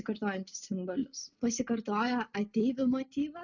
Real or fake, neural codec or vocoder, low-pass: real; none; 7.2 kHz